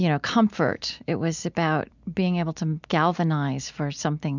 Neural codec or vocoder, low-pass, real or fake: none; 7.2 kHz; real